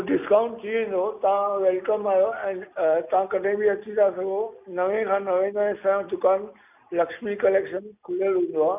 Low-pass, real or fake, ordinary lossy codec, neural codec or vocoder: 3.6 kHz; real; none; none